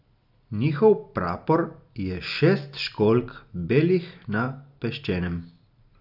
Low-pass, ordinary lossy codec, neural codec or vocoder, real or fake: 5.4 kHz; none; none; real